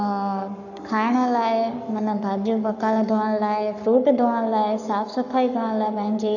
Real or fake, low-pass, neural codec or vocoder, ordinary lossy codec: fake; 7.2 kHz; codec, 44.1 kHz, 7.8 kbps, Pupu-Codec; none